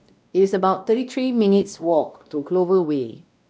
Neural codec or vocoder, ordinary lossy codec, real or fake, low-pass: codec, 16 kHz, 1 kbps, X-Codec, WavLM features, trained on Multilingual LibriSpeech; none; fake; none